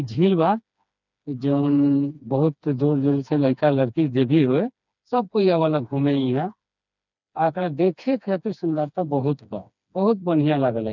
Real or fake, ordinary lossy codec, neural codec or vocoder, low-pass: fake; none; codec, 16 kHz, 2 kbps, FreqCodec, smaller model; 7.2 kHz